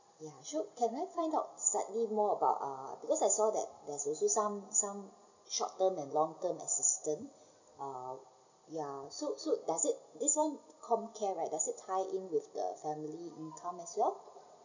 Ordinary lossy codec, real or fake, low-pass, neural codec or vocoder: none; real; 7.2 kHz; none